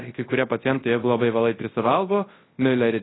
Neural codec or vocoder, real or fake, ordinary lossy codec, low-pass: codec, 24 kHz, 0.9 kbps, WavTokenizer, large speech release; fake; AAC, 16 kbps; 7.2 kHz